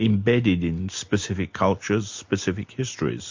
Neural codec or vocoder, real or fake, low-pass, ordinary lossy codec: none; real; 7.2 kHz; MP3, 48 kbps